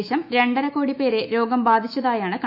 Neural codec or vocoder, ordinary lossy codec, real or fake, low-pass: autoencoder, 48 kHz, 128 numbers a frame, DAC-VAE, trained on Japanese speech; none; fake; 5.4 kHz